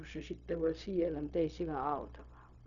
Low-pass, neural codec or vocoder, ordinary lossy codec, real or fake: 7.2 kHz; codec, 16 kHz, 0.4 kbps, LongCat-Audio-Codec; none; fake